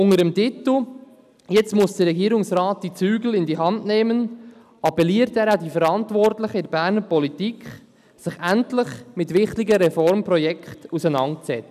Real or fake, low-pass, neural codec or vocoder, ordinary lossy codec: real; 14.4 kHz; none; none